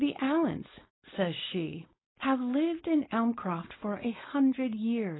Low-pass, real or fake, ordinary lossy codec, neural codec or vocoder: 7.2 kHz; fake; AAC, 16 kbps; codec, 16 kHz, 4.8 kbps, FACodec